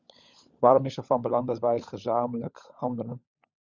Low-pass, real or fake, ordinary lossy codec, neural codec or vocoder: 7.2 kHz; fake; Opus, 64 kbps; codec, 16 kHz, 16 kbps, FunCodec, trained on LibriTTS, 50 frames a second